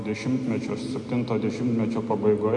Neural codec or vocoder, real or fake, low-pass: none; real; 10.8 kHz